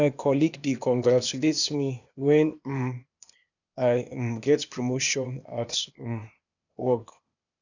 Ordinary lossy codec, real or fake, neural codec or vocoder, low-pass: none; fake; codec, 16 kHz, 0.8 kbps, ZipCodec; 7.2 kHz